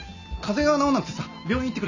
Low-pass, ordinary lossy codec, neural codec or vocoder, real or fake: 7.2 kHz; none; none; real